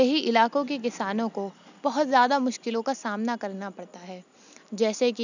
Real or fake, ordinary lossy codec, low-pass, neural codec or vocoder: real; none; 7.2 kHz; none